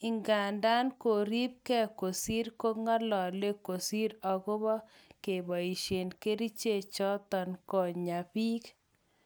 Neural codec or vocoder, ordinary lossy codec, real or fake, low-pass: none; none; real; none